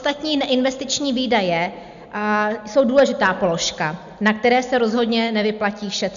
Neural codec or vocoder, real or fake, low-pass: none; real; 7.2 kHz